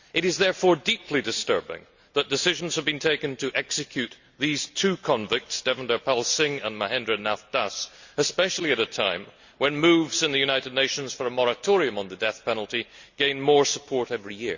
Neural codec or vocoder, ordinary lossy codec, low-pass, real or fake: none; Opus, 64 kbps; 7.2 kHz; real